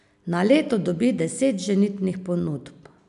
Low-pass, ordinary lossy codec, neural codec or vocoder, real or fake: 10.8 kHz; none; none; real